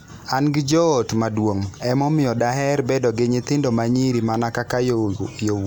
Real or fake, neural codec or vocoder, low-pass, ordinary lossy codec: real; none; none; none